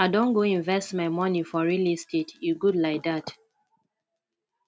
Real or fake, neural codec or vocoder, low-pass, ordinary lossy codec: real; none; none; none